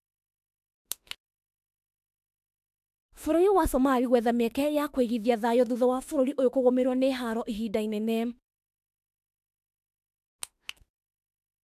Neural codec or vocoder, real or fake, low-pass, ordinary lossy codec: autoencoder, 48 kHz, 32 numbers a frame, DAC-VAE, trained on Japanese speech; fake; 14.4 kHz; AAC, 96 kbps